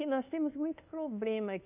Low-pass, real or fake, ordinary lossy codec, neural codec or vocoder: 3.6 kHz; fake; none; codec, 24 kHz, 1.2 kbps, DualCodec